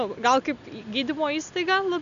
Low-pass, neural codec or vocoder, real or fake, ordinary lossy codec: 7.2 kHz; none; real; MP3, 96 kbps